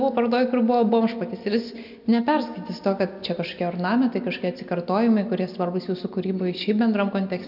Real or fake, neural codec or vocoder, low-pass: real; none; 5.4 kHz